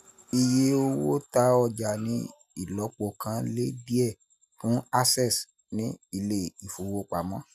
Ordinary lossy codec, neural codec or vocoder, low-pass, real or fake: none; none; 14.4 kHz; real